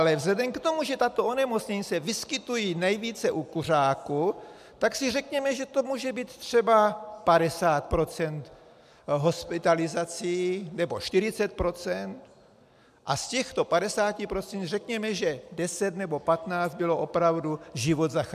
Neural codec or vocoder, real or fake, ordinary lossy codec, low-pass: none; real; MP3, 96 kbps; 14.4 kHz